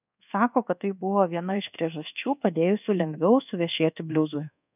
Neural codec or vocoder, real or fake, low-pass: codec, 24 kHz, 1.2 kbps, DualCodec; fake; 3.6 kHz